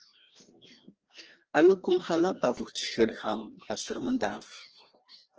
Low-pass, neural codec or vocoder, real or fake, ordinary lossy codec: 7.2 kHz; codec, 16 kHz, 1 kbps, FreqCodec, larger model; fake; Opus, 16 kbps